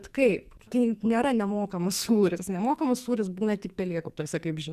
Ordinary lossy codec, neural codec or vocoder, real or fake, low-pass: Opus, 64 kbps; codec, 44.1 kHz, 2.6 kbps, SNAC; fake; 14.4 kHz